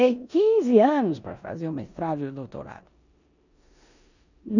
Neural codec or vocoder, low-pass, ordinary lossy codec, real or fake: codec, 16 kHz in and 24 kHz out, 0.9 kbps, LongCat-Audio-Codec, four codebook decoder; 7.2 kHz; none; fake